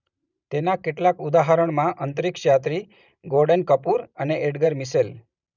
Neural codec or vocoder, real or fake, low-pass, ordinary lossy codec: vocoder, 44.1 kHz, 128 mel bands every 512 samples, BigVGAN v2; fake; 7.2 kHz; none